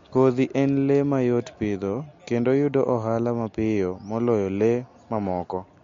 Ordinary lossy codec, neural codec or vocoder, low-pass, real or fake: MP3, 48 kbps; none; 7.2 kHz; real